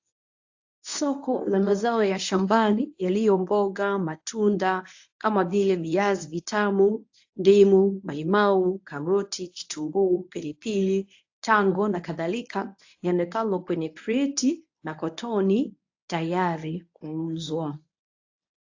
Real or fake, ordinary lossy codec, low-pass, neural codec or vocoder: fake; AAC, 48 kbps; 7.2 kHz; codec, 24 kHz, 0.9 kbps, WavTokenizer, medium speech release version 1